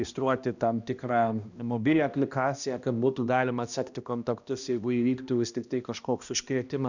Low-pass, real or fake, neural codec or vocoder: 7.2 kHz; fake; codec, 16 kHz, 1 kbps, X-Codec, HuBERT features, trained on balanced general audio